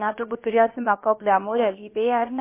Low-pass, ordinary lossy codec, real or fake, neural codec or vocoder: 3.6 kHz; MP3, 24 kbps; fake; codec, 16 kHz, about 1 kbps, DyCAST, with the encoder's durations